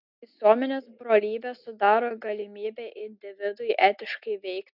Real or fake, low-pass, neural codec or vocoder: real; 5.4 kHz; none